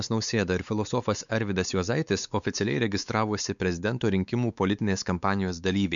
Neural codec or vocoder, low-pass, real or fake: codec, 16 kHz, 4 kbps, X-Codec, WavLM features, trained on Multilingual LibriSpeech; 7.2 kHz; fake